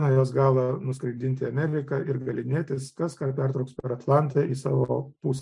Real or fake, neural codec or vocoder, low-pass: real; none; 10.8 kHz